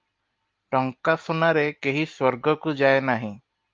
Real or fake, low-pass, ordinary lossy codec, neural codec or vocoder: real; 7.2 kHz; Opus, 32 kbps; none